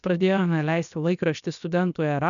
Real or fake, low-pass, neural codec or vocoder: fake; 7.2 kHz; codec, 16 kHz, 0.7 kbps, FocalCodec